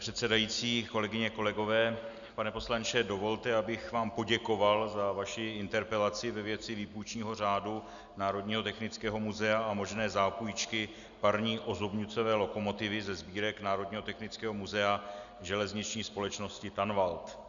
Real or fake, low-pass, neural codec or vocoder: real; 7.2 kHz; none